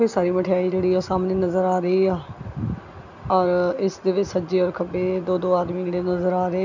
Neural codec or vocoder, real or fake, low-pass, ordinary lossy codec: none; real; 7.2 kHz; none